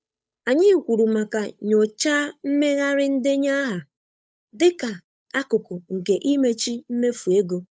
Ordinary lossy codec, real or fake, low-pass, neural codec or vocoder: none; fake; none; codec, 16 kHz, 8 kbps, FunCodec, trained on Chinese and English, 25 frames a second